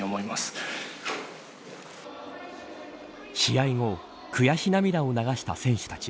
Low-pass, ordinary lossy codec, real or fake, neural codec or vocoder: none; none; real; none